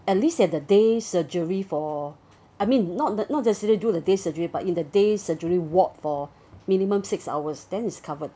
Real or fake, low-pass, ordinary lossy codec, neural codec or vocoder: real; none; none; none